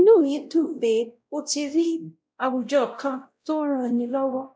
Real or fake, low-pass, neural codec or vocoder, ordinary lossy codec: fake; none; codec, 16 kHz, 0.5 kbps, X-Codec, WavLM features, trained on Multilingual LibriSpeech; none